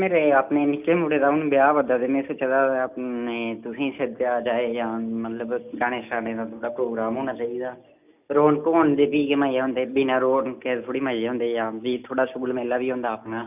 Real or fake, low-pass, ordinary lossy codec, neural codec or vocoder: real; 3.6 kHz; none; none